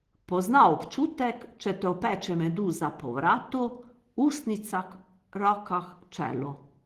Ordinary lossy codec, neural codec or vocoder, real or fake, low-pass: Opus, 16 kbps; none; real; 14.4 kHz